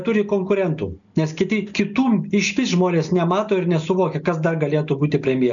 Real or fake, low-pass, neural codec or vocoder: real; 7.2 kHz; none